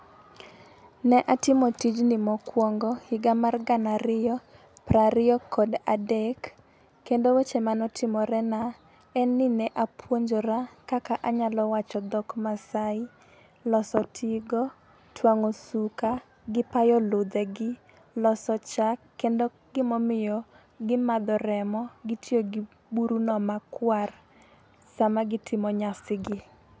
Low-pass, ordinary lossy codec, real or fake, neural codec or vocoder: none; none; real; none